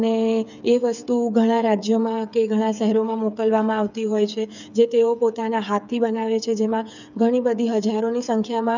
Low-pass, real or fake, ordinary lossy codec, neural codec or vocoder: 7.2 kHz; fake; none; codec, 16 kHz, 8 kbps, FreqCodec, smaller model